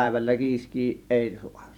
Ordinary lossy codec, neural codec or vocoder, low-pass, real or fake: none; vocoder, 44.1 kHz, 128 mel bands every 512 samples, BigVGAN v2; 19.8 kHz; fake